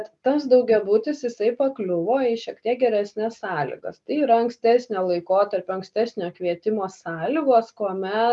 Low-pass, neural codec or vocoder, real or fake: 10.8 kHz; none; real